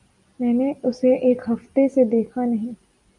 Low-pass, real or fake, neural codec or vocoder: 10.8 kHz; real; none